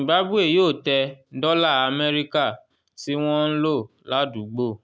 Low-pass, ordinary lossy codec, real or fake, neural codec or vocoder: none; none; real; none